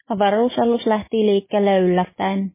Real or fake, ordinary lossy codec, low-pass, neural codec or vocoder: real; MP3, 16 kbps; 3.6 kHz; none